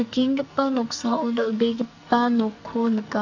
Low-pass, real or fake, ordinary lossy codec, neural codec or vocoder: 7.2 kHz; fake; AAC, 48 kbps; codec, 44.1 kHz, 2.6 kbps, SNAC